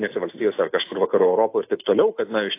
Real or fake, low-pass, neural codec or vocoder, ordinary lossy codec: real; 3.6 kHz; none; AAC, 24 kbps